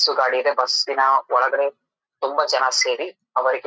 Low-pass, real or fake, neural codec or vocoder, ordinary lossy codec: none; real; none; none